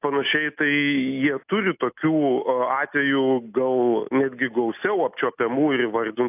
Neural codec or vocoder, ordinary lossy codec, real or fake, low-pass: none; AAC, 32 kbps; real; 3.6 kHz